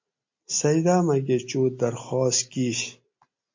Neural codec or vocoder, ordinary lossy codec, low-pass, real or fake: none; MP3, 48 kbps; 7.2 kHz; real